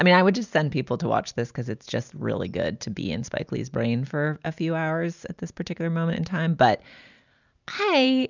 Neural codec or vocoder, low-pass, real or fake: none; 7.2 kHz; real